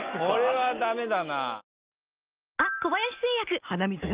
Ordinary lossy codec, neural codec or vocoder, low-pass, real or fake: Opus, 32 kbps; none; 3.6 kHz; real